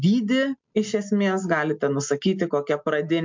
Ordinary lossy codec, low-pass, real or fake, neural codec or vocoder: MP3, 64 kbps; 7.2 kHz; real; none